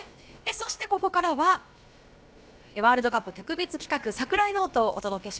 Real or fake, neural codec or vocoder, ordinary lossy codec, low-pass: fake; codec, 16 kHz, about 1 kbps, DyCAST, with the encoder's durations; none; none